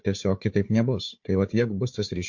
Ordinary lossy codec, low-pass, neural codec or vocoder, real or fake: MP3, 48 kbps; 7.2 kHz; codec, 16 kHz, 4 kbps, FunCodec, trained on Chinese and English, 50 frames a second; fake